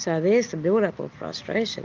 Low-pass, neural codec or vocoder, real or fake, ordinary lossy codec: 7.2 kHz; none; real; Opus, 24 kbps